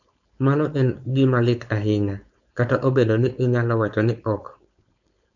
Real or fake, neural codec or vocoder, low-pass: fake; codec, 16 kHz, 4.8 kbps, FACodec; 7.2 kHz